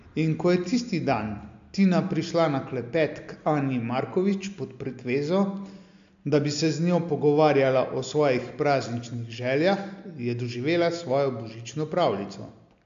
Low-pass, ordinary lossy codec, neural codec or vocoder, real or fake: 7.2 kHz; AAC, 64 kbps; none; real